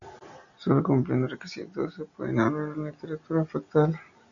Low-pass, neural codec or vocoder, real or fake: 7.2 kHz; none; real